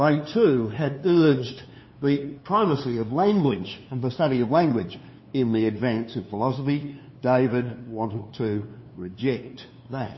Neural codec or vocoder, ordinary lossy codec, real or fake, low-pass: codec, 16 kHz, 2 kbps, FunCodec, trained on LibriTTS, 25 frames a second; MP3, 24 kbps; fake; 7.2 kHz